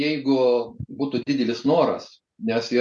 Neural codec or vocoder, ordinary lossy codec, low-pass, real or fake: none; MP3, 64 kbps; 10.8 kHz; real